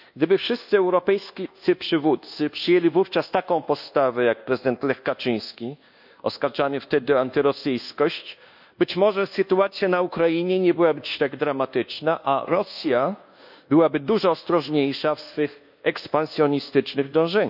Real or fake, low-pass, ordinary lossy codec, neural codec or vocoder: fake; 5.4 kHz; none; codec, 24 kHz, 1.2 kbps, DualCodec